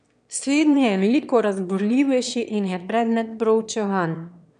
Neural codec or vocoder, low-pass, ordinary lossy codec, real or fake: autoencoder, 22.05 kHz, a latent of 192 numbers a frame, VITS, trained on one speaker; 9.9 kHz; none; fake